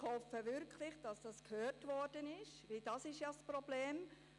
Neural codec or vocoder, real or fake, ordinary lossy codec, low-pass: none; real; none; 10.8 kHz